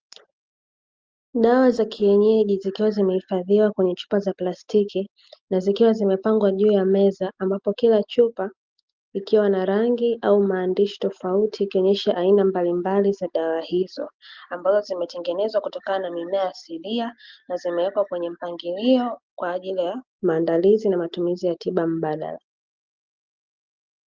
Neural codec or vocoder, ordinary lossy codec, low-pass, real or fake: none; Opus, 24 kbps; 7.2 kHz; real